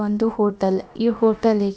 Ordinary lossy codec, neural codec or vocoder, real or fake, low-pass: none; codec, 16 kHz, about 1 kbps, DyCAST, with the encoder's durations; fake; none